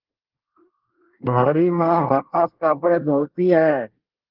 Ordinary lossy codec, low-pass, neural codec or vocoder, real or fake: Opus, 16 kbps; 5.4 kHz; codec, 24 kHz, 1 kbps, SNAC; fake